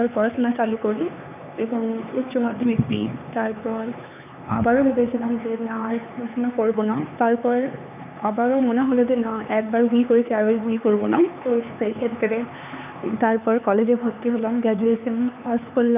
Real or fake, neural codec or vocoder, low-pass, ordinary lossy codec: fake; codec, 16 kHz, 4 kbps, X-Codec, HuBERT features, trained on LibriSpeech; 3.6 kHz; none